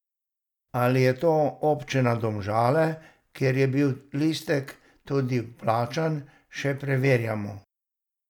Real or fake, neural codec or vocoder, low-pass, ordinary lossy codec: real; none; 19.8 kHz; none